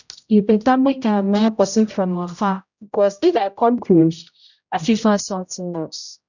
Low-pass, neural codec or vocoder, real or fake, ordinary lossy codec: 7.2 kHz; codec, 16 kHz, 0.5 kbps, X-Codec, HuBERT features, trained on general audio; fake; none